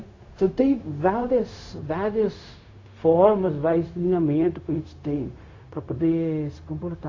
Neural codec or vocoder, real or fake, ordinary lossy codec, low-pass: codec, 16 kHz, 0.4 kbps, LongCat-Audio-Codec; fake; AAC, 32 kbps; 7.2 kHz